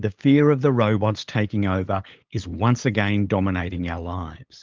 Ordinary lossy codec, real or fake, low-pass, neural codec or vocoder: Opus, 24 kbps; fake; 7.2 kHz; codec, 16 kHz, 8 kbps, FunCodec, trained on LibriTTS, 25 frames a second